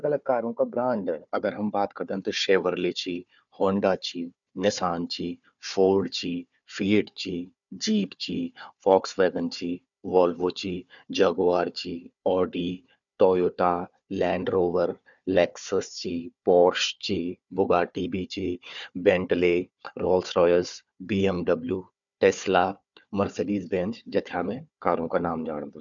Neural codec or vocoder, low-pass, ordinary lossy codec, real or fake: codec, 16 kHz, 4 kbps, FunCodec, trained on Chinese and English, 50 frames a second; 7.2 kHz; none; fake